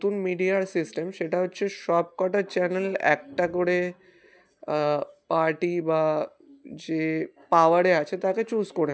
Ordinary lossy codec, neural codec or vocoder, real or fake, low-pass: none; none; real; none